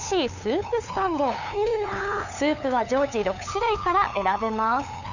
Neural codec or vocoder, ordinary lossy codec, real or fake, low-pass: codec, 16 kHz, 8 kbps, FunCodec, trained on LibriTTS, 25 frames a second; none; fake; 7.2 kHz